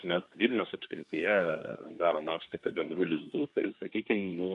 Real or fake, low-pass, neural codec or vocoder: fake; 10.8 kHz; codec, 24 kHz, 1 kbps, SNAC